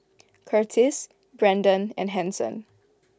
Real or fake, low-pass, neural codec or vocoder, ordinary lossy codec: real; none; none; none